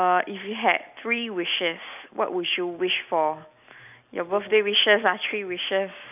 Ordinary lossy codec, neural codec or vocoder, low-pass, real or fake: none; none; 3.6 kHz; real